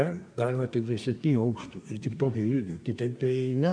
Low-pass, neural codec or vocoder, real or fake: 9.9 kHz; codec, 24 kHz, 1 kbps, SNAC; fake